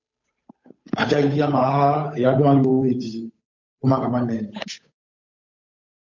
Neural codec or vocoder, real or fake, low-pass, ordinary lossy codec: codec, 16 kHz, 8 kbps, FunCodec, trained on Chinese and English, 25 frames a second; fake; 7.2 kHz; MP3, 48 kbps